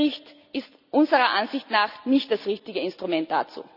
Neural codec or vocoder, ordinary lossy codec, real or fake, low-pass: none; none; real; 5.4 kHz